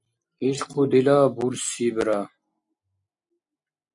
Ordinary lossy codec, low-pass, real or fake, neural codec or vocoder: MP3, 64 kbps; 10.8 kHz; real; none